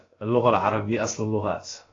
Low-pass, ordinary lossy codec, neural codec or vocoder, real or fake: 7.2 kHz; AAC, 32 kbps; codec, 16 kHz, about 1 kbps, DyCAST, with the encoder's durations; fake